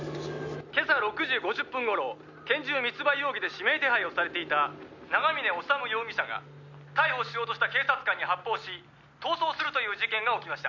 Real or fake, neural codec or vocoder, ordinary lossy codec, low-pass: real; none; none; 7.2 kHz